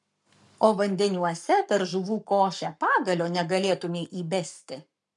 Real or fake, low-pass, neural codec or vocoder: fake; 10.8 kHz; codec, 44.1 kHz, 7.8 kbps, Pupu-Codec